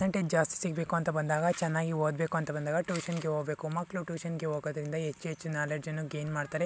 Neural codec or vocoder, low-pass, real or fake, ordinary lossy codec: none; none; real; none